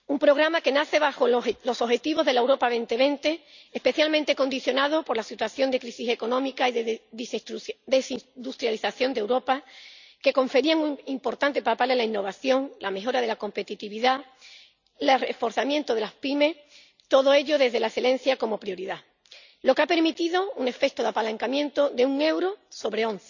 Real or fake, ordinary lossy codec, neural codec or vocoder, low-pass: real; none; none; 7.2 kHz